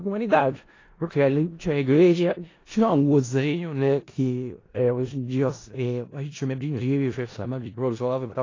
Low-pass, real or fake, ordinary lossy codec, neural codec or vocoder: 7.2 kHz; fake; AAC, 32 kbps; codec, 16 kHz in and 24 kHz out, 0.4 kbps, LongCat-Audio-Codec, four codebook decoder